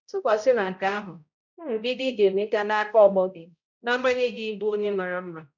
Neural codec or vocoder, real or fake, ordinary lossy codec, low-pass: codec, 16 kHz, 0.5 kbps, X-Codec, HuBERT features, trained on balanced general audio; fake; none; 7.2 kHz